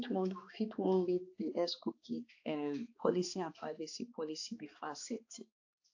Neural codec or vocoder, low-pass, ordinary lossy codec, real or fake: codec, 16 kHz, 2 kbps, X-Codec, HuBERT features, trained on balanced general audio; 7.2 kHz; AAC, 48 kbps; fake